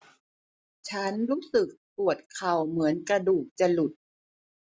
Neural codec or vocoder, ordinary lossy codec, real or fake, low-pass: none; none; real; none